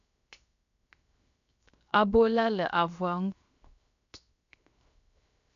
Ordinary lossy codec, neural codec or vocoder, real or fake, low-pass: MP3, 64 kbps; codec, 16 kHz, 0.7 kbps, FocalCodec; fake; 7.2 kHz